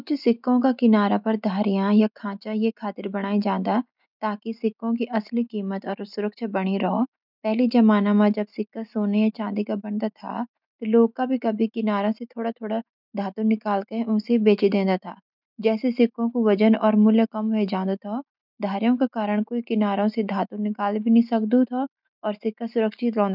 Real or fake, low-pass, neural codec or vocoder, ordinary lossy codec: real; 5.4 kHz; none; none